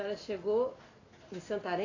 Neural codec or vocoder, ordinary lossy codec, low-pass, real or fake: none; AAC, 32 kbps; 7.2 kHz; real